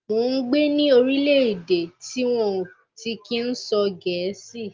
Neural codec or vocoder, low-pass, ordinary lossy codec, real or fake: none; 7.2 kHz; Opus, 32 kbps; real